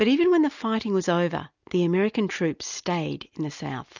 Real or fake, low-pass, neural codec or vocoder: real; 7.2 kHz; none